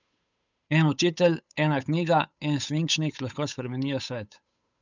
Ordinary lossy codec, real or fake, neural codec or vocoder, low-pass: none; fake; codec, 16 kHz, 8 kbps, FunCodec, trained on Chinese and English, 25 frames a second; 7.2 kHz